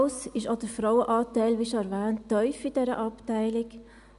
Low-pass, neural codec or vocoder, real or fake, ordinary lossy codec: 10.8 kHz; none; real; none